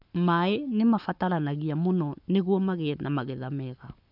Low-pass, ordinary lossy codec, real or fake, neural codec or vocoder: 5.4 kHz; none; fake; codec, 44.1 kHz, 7.8 kbps, Pupu-Codec